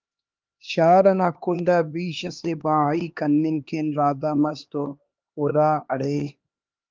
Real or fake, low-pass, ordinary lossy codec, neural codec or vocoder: fake; 7.2 kHz; Opus, 32 kbps; codec, 16 kHz, 2 kbps, X-Codec, HuBERT features, trained on LibriSpeech